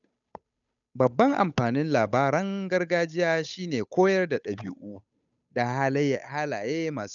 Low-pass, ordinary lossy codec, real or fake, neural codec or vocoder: 7.2 kHz; none; fake; codec, 16 kHz, 8 kbps, FunCodec, trained on Chinese and English, 25 frames a second